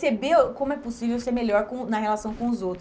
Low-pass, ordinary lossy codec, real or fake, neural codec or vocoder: none; none; real; none